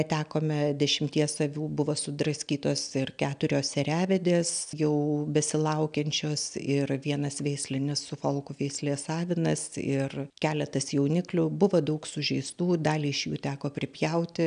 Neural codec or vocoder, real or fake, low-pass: none; real; 9.9 kHz